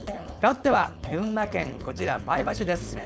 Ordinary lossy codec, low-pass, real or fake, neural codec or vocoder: none; none; fake; codec, 16 kHz, 4.8 kbps, FACodec